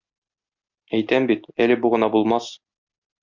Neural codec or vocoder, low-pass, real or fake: none; 7.2 kHz; real